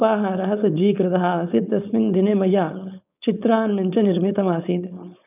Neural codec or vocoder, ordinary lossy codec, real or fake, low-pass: codec, 16 kHz, 4.8 kbps, FACodec; none; fake; 3.6 kHz